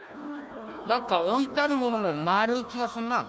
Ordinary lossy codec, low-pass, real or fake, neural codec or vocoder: none; none; fake; codec, 16 kHz, 1 kbps, FunCodec, trained on Chinese and English, 50 frames a second